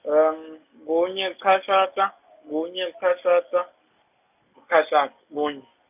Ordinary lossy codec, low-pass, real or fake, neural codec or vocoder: none; 3.6 kHz; real; none